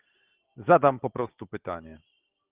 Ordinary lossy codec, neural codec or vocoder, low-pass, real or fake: Opus, 24 kbps; none; 3.6 kHz; real